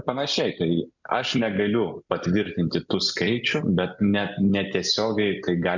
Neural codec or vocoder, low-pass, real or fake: none; 7.2 kHz; real